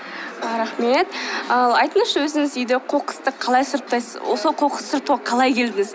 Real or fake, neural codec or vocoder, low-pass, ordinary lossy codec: real; none; none; none